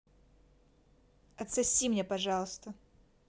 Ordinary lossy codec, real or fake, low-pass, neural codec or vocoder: none; real; none; none